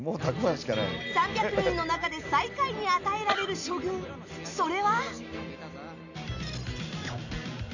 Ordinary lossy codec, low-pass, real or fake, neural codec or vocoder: none; 7.2 kHz; real; none